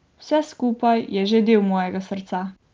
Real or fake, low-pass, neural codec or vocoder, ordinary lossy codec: real; 7.2 kHz; none; Opus, 24 kbps